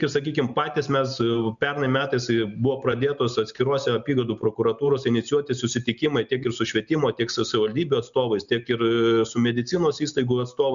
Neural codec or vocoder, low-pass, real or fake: none; 7.2 kHz; real